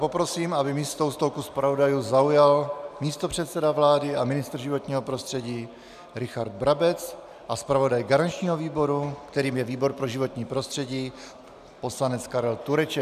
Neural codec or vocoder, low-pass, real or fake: none; 14.4 kHz; real